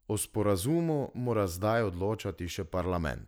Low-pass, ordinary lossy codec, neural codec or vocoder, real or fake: none; none; none; real